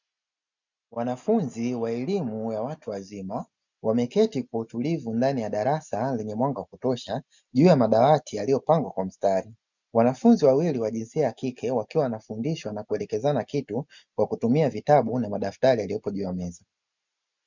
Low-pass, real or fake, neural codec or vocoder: 7.2 kHz; real; none